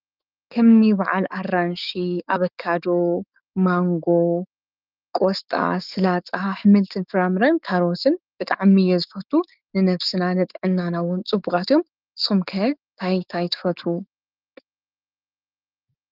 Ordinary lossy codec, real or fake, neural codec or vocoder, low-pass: Opus, 24 kbps; fake; autoencoder, 48 kHz, 128 numbers a frame, DAC-VAE, trained on Japanese speech; 5.4 kHz